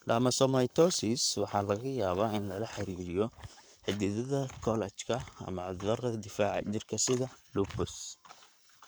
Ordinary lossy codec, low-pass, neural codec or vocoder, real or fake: none; none; codec, 44.1 kHz, 7.8 kbps, Pupu-Codec; fake